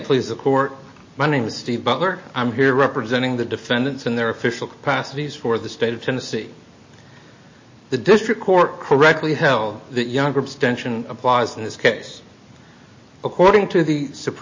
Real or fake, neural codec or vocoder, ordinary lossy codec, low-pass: real; none; MP3, 32 kbps; 7.2 kHz